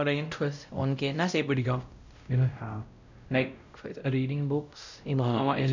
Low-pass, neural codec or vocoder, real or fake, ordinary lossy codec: 7.2 kHz; codec, 16 kHz, 0.5 kbps, X-Codec, WavLM features, trained on Multilingual LibriSpeech; fake; none